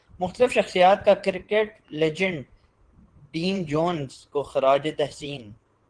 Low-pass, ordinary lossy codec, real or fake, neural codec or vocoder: 9.9 kHz; Opus, 16 kbps; fake; vocoder, 22.05 kHz, 80 mel bands, WaveNeXt